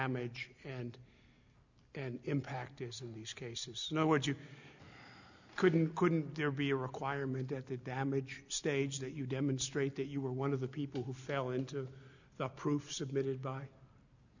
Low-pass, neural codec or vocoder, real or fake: 7.2 kHz; none; real